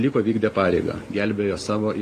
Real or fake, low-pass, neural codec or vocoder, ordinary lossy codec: real; 14.4 kHz; none; AAC, 48 kbps